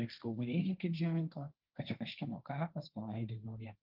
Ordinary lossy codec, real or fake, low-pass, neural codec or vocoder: Opus, 24 kbps; fake; 5.4 kHz; codec, 16 kHz, 1.1 kbps, Voila-Tokenizer